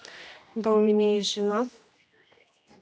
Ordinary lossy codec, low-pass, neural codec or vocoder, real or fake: none; none; codec, 16 kHz, 1 kbps, X-Codec, HuBERT features, trained on general audio; fake